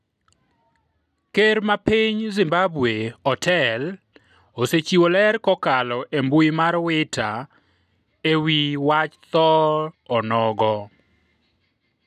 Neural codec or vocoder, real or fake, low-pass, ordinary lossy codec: none; real; 14.4 kHz; none